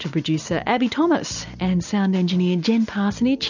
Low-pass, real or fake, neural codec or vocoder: 7.2 kHz; real; none